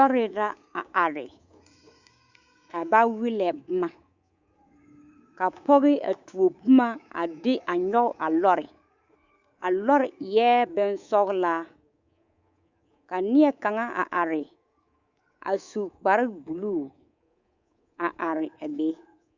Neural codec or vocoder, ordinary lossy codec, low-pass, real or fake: codec, 24 kHz, 3.1 kbps, DualCodec; Opus, 64 kbps; 7.2 kHz; fake